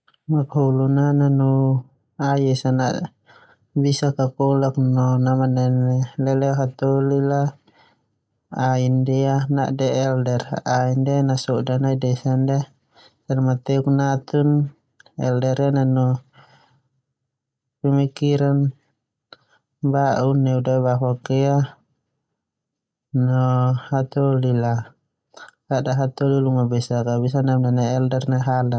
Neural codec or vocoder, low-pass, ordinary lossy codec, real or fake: none; none; none; real